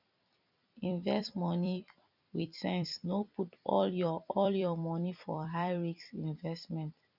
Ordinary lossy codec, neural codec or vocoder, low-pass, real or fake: none; vocoder, 44.1 kHz, 128 mel bands every 256 samples, BigVGAN v2; 5.4 kHz; fake